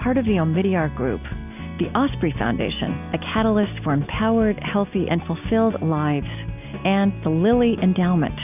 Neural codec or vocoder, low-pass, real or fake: none; 3.6 kHz; real